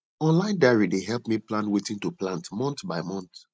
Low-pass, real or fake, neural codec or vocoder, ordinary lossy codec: none; real; none; none